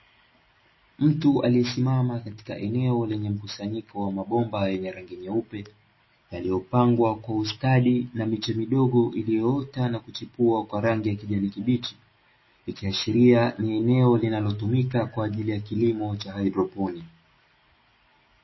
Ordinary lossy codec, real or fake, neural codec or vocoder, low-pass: MP3, 24 kbps; real; none; 7.2 kHz